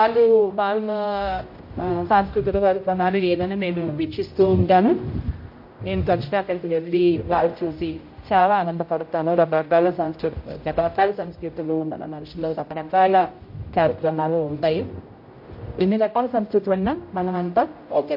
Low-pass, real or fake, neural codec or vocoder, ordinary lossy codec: 5.4 kHz; fake; codec, 16 kHz, 0.5 kbps, X-Codec, HuBERT features, trained on general audio; MP3, 32 kbps